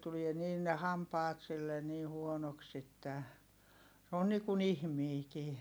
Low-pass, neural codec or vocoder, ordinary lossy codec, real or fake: none; none; none; real